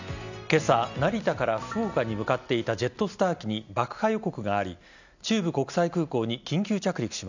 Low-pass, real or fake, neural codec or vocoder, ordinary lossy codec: 7.2 kHz; real; none; none